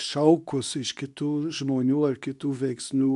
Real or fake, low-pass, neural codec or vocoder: fake; 10.8 kHz; codec, 24 kHz, 0.9 kbps, WavTokenizer, medium speech release version 2